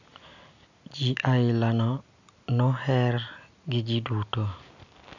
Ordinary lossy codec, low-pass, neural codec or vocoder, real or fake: none; 7.2 kHz; none; real